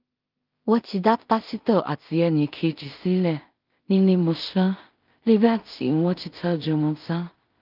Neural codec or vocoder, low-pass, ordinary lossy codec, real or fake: codec, 16 kHz in and 24 kHz out, 0.4 kbps, LongCat-Audio-Codec, two codebook decoder; 5.4 kHz; Opus, 24 kbps; fake